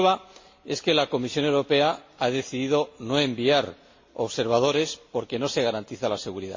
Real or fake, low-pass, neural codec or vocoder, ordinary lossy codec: real; 7.2 kHz; none; MP3, 32 kbps